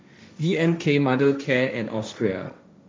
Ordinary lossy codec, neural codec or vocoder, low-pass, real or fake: none; codec, 16 kHz, 1.1 kbps, Voila-Tokenizer; none; fake